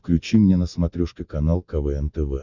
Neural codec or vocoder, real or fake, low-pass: none; real; 7.2 kHz